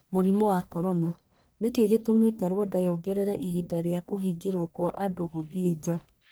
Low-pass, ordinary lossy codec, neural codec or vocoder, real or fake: none; none; codec, 44.1 kHz, 1.7 kbps, Pupu-Codec; fake